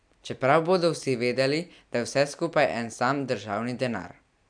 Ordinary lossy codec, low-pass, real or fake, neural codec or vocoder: MP3, 96 kbps; 9.9 kHz; real; none